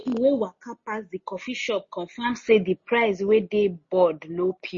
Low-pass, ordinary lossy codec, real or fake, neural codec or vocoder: 7.2 kHz; MP3, 32 kbps; real; none